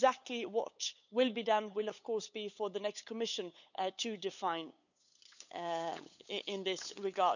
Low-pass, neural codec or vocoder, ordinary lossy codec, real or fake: 7.2 kHz; codec, 16 kHz, 8 kbps, FunCodec, trained on LibriTTS, 25 frames a second; none; fake